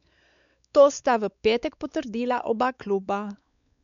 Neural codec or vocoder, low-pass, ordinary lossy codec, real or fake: codec, 16 kHz, 4 kbps, X-Codec, WavLM features, trained on Multilingual LibriSpeech; 7.2 kHz; none; fake